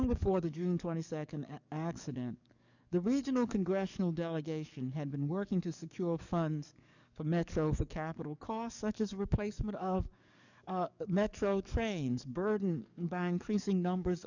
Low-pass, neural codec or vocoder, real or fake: 7.2 kHz; codec, 44.1 kHz, 7.8 kbps, DAC; fake